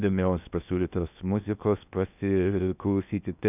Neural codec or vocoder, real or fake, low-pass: codec, 16 kHz in and 24 kHz out, 0.8 kbps, FocalCodec, streaming, 65536 codes; fake; 3.6 kHz